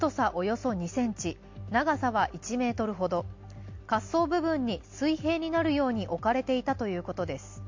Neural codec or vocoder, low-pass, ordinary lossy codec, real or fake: none; 7.2 kHz; MP3, 48 kbps; real